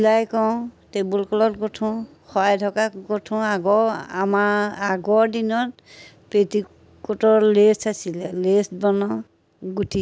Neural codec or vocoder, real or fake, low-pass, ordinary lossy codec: none; real; none; none